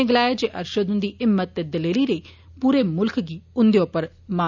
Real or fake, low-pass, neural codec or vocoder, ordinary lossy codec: real; 7.2 kHz; none; none